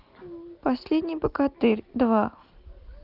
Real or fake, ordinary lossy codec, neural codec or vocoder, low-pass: real; Opus, 24 kbps; none; 5.4 kHz